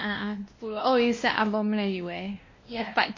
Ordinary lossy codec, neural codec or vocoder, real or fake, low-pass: MP3, 32 kbps; codec, 16 kHz, 1 kbps, X-Codec, WavLM features, trained on Multilingual LibriSpeech; fake; 7.2 kHz